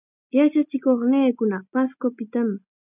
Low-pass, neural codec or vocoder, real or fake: 3.6 kHz; none; real